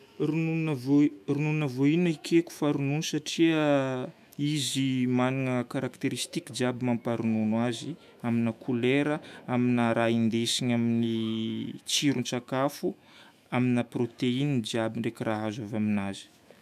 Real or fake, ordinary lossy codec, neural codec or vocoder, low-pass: fake; none; autoencoder, 48 kHz, 128 numbers a frame, DAC-VAE, trained on Japanese speech; 14.4 kHz